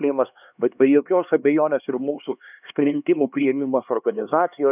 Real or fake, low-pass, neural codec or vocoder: fake; 3.6 kHz; codec, 16 kHz, 2 kbps, X-Codec, HuBERT features, trained on LibriSpeech